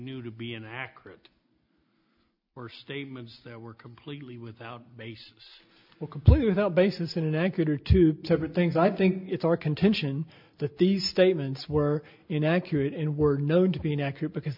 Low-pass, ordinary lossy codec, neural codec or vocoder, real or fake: 5.4 kHz; MP3, 48 kbps; none; real